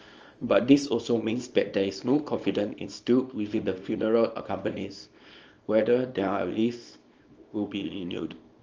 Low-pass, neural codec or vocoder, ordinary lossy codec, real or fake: 7.2 kHz; codec, 24 kHz, 0.9 kbps, WavTokenizer, small release; Opus, 24 kbps; fake